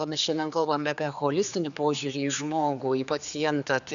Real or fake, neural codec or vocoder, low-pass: fake; codec, 16 kHz, 4 kbps, X-Codec, HuBERT features, trained on general audio; 7.2 kHz